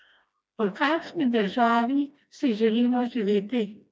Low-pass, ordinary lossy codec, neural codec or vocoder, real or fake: none; none; codec, 16 kHz, 1 kbps, FreqCodec, smaller model; fake